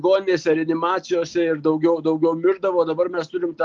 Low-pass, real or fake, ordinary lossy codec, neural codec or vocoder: 7.2 kHz; real; Opus, 32 kbps; none